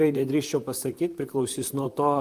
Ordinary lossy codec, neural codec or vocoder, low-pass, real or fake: Opus, 32 kbps; vocoder, 44.1 kHz, 128 mel bands, Pupu-Vocoder; 14.4 kHz; fake